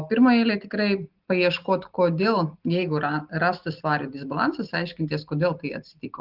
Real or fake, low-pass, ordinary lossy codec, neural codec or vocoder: real; 5.4 kHz; Opus, 32 kbps; none